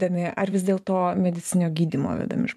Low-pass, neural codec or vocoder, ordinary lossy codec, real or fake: 14.4 kHz; vocoder, 44.1 kHz, 128 mel bands every 256 samples, BigVGAN v2; MP3, 96 kbps; fake